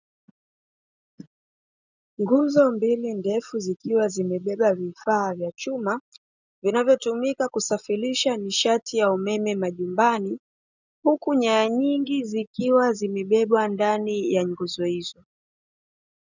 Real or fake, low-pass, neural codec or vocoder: real; 7.2 kHz; none